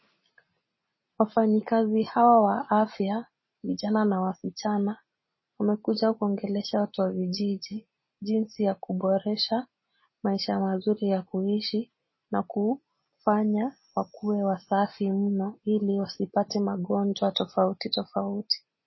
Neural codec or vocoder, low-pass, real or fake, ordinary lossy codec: none; 7.2 kHz; real; MP3, 24 kbps